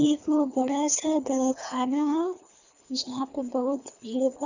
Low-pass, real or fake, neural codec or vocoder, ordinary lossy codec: 7.2 kHz; fake; codec, 24 kHz, 3 kbps, HILCodec; none